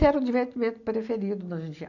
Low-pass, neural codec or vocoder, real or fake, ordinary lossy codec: 7.2 kHz; none; real; none